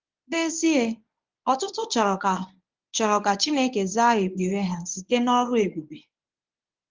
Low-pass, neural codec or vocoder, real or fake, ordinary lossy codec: 7.2 kHz; codec, 24 kHz, 0.9 kbps, WavTokenizer, medium speech release version 1; fake; Opus, 32 kbps